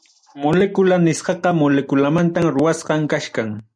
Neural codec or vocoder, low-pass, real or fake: none; 9.9 kHz; real